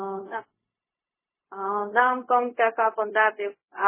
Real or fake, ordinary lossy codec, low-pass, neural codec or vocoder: fake; MP3, 16 kbps; 3.6 kHz; codec, 16 kHz, 0.4 kbps, LongCat-Audio-Codec